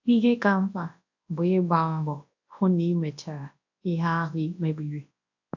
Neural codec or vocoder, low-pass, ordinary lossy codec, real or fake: codec, 24 kHz, 0.9 kbps, WavTokenizer, large speech release; 7.2 kHz; AAC, 48 kbps; fake